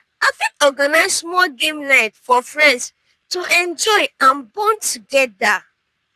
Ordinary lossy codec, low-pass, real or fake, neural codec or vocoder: none; 14.4 kHz; fake; codec, 44.1 kHz, 3.4 kbps, Pupu-Codec